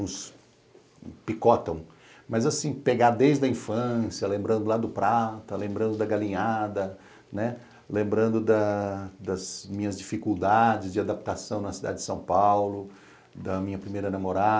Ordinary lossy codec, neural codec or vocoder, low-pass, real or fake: none; none; none; real